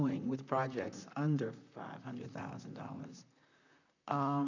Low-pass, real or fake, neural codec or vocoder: 7.2 kHz; fake; vocoder, 44.1 kHz, 128 mel bands, Pupu-Vocoder